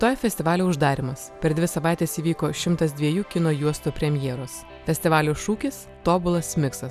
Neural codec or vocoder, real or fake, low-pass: none; real; 14.4 kHz